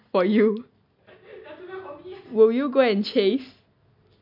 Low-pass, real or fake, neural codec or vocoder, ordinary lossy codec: 5.4 kHz; real; none; MP3, 48 kbps